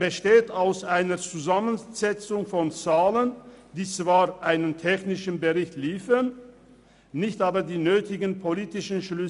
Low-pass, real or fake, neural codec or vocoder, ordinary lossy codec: 10.8 kHz; real; none; none